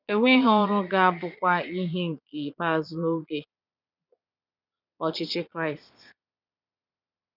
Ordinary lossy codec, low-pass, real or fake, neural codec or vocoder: none; 5.4 kHz; fake; vocoder, 22.05 kHz, 80 mel bands, Vocos